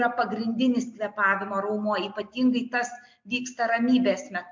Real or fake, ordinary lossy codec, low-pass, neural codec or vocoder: real; MP3, 64 kbps; 7.2 kHz; none